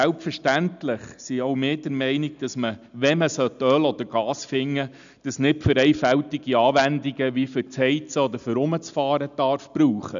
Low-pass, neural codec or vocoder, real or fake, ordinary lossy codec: 7.2 kHz; none; real; none